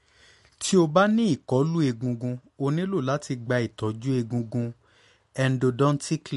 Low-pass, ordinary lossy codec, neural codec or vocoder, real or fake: 14.4 kHz; MP3, 48 kbps; none; real